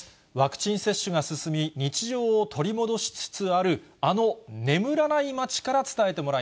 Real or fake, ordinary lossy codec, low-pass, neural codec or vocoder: real; none; none; none